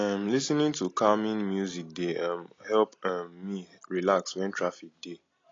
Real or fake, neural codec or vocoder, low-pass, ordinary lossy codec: real; none; 7.2 kHz; AAC, 32 kbps